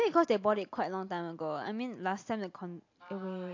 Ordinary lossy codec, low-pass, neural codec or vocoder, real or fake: AAC, 48 kbps; 7.2 kHz; none; real